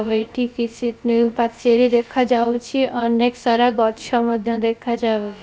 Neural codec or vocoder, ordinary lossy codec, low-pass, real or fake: codec, 16 kHz, about 1 kbps, DyCAST, with the encoder's durations; none; none; fake